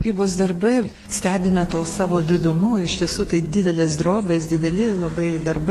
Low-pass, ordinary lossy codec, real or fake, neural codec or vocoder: 14.4 kHz; AAC, 48 kbps; fake; codec, 32 kHz, 1.9 kbps, SNAC